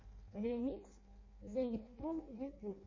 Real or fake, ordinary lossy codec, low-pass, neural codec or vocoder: fake; MP3, 32 kbps; 7.2 kHz; codec, 16 kHz in and 24 kHz out, 0.6 kbps, FireRedTTS-2 codec